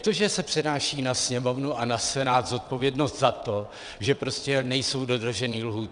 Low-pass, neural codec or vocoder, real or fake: 9.9 kHz; vocoder, 22.05 kHz, 80 mel bands, WaveNeXt; fake